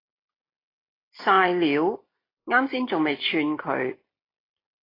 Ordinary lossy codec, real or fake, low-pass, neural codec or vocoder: AAC, 24 kbps; real; 5.4 kHz; none